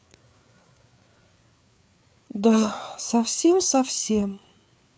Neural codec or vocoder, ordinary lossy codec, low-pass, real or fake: codec, 16 kHz, 4 kbps, FreqCodec, larger model; none; none; fake